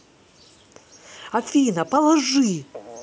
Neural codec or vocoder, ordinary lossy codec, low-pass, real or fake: none; none; none; real